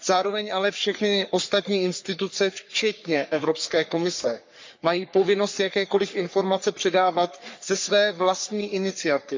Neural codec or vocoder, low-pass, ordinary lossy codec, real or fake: codec, 44.1 kHz, 3.4 kbps, Pupu-Codec; 7.2 kHz; MP3, 64 kbps; fake